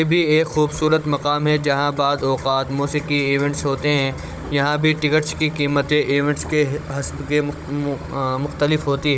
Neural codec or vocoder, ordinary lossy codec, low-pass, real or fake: codec, 16 kHz, 16 kbps, FunCodec, trained on Chinese and English, 50 frames a second; none; none; fake